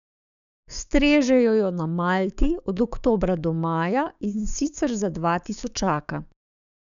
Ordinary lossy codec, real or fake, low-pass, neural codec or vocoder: none; fake; 7.2 kHz; codec, 16 kHz, 6 kbps, DAC